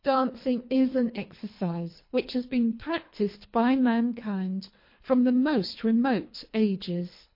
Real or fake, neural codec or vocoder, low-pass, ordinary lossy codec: fake; codec, 24 kHz, 3 kbps, HILCodec; 5.4 kHz; MP3, 32 kbps